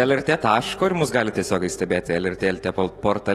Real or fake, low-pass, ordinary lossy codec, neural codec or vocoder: fake; 19.8 kHz; AAC, 32 kbps; autoencoder, 48 kHz, 128 numbers a frame, DAC-VAE, trained on Japanese speech